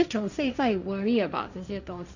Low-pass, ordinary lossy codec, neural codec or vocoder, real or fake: 7.2 kHz; none; codec, 16 kHz, 1.1 kbps, Voila-Tokenizer; fake